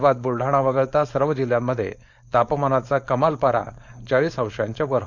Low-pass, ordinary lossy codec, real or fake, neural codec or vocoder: 7.2 kHz; none; fake; codec, 16 kHz, 4.8 kbps, FACodec